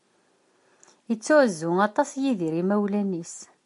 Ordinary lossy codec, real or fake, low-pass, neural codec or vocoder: AAC, 64 kbps; real; 10.8 kHz; none